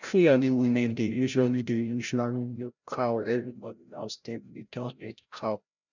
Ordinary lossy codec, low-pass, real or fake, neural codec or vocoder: none; 7.2 kHz; fake; codec, 16 kHz, 0.5 kbps, FreqCodec, larger model